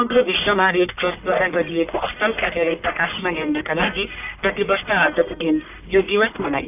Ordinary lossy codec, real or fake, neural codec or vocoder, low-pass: none; fake; codec, 44.1 kHz, 1.7 kbps, Pupu-Codec; 3.6 kHz